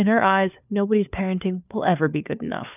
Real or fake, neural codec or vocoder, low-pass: fake; codec, 16 kHz, 4 kbps, FunCodec, trained on LibriTTS, 50 frames a second; 3.6 kHz